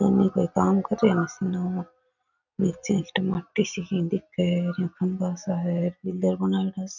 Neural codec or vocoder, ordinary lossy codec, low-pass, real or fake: none; none; 7.2 kHz; real